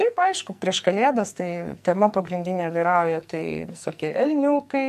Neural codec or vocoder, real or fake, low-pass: codec, 44.1 kHz, 2.6 kbps, SNAC; fake; 14.4 kHz